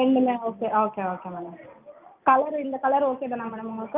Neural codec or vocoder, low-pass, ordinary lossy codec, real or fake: none; 3.6 kHz; Opus, 24 kbps; real